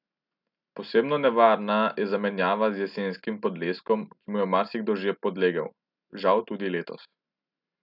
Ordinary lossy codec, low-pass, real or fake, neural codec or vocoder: none; 5.4 kHz; real; none